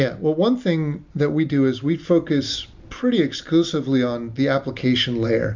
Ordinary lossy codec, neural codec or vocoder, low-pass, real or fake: MP3, 64 kbps; none; 7.2 kHz; real